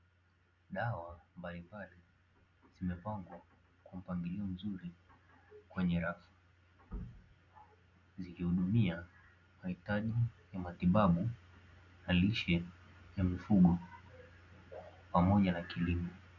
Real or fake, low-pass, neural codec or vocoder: real; 7.2 kHz; none